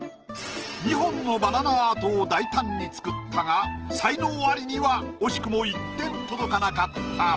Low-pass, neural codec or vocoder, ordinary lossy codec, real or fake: 7.2 kHz; none; Opus, 16 kbps; real